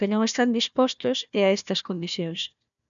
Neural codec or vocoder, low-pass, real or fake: codec, 16 kHz, 1 kbps, FunCodec, trained on Chinese and English, 50 frames a second; 7.2 kHz; fake